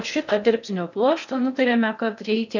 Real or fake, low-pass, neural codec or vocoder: fake; 7.2 kHz; codec, 16 kHz in and 24 kHz out, 0.6 kbps, FocalCodec, streaming, 4096 codes